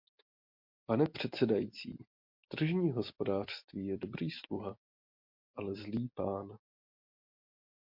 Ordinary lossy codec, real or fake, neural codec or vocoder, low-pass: MP3, 48 kbps; real; none; 5.4 kHz